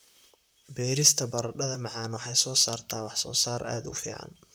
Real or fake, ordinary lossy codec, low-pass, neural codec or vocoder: fake; none; none; vocoder, 44.1 kHz, 128 mel bands, Pupu-Vocoder